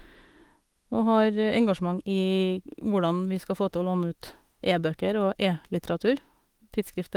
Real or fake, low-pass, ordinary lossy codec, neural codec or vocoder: fake; 19.8 kHz; Opus, 24 kbps; autoencoder, 48 kHz, 32 numbers a frame, DAC-VAE, trained on Japanese speech